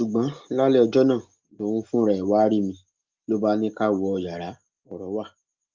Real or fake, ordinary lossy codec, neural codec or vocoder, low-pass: real; Opus, 32 kbps; none; 7.2 kHz